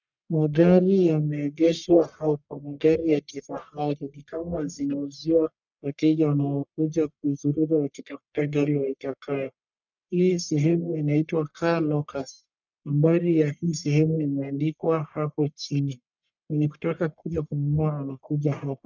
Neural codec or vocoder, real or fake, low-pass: codec, 44.1 kHz, 1.7 kbps, Pupu-Codec; fake; 7.2 kHz